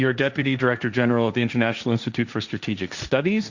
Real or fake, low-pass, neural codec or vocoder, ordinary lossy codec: fake; 7.2 kHz; codec, 16 kHz, 1.1 kbps, Voila-Tokenizer; Opus, 64 kbps